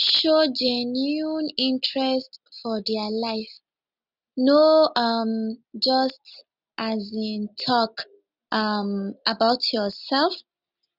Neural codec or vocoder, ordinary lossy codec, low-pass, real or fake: none; none; 5.4 kHz; real